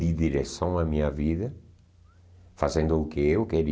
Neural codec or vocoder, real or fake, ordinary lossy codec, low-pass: none; real; none; none